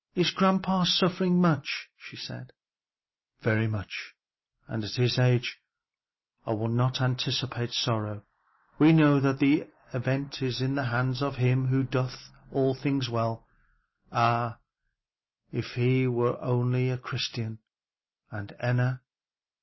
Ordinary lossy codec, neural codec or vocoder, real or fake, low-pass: MP3, 24 kbps; none; real; 7.2 kHz